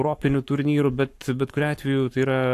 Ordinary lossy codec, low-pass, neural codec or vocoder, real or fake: AAC, 64 kbps; 14.4 kHz; codec, 44.1 kHz, 7.8 kbps, Pupu-Codec; fake